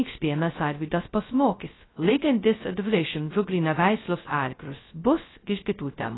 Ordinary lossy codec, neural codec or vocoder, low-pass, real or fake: AAC, 16 kbps; codec, 16 kHz, 0.2 kbps, FocalCodec; 7.2 kHz; fake